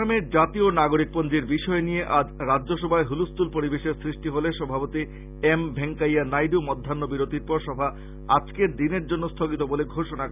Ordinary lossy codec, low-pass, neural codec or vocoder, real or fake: none; 3.6 kHz; none; real